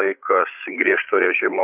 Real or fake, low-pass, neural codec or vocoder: fake; 3.6 kHz; codec, 16 kHz, 8 kbps, FreqCodec, larger model